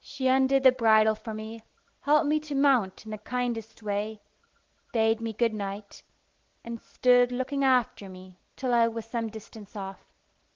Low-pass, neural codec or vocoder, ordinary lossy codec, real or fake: 7.2 kHz; none; Opus, 16 kbps; real